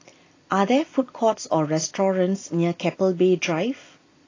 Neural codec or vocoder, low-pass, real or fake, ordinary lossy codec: none; 7.2 kHz; real; AAC, 32 kbps